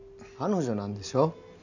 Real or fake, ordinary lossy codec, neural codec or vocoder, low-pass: real; none; none; 7.2 kHz